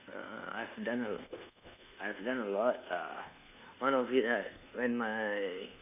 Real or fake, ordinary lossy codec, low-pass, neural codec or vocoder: fake; none; 3.6 kHz; codec, 24 kHz, 1.2 kbps, DualCodec